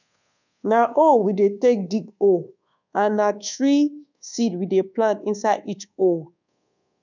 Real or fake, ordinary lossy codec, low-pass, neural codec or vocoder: fake; none; 7.2 kHz; codec, 24 kHz, 1.2 kbps, DualCodec